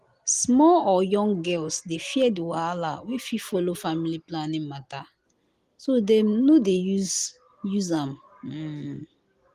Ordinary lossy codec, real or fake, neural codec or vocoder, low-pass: Opus, 24 kbps; real; none; 14.4 kHz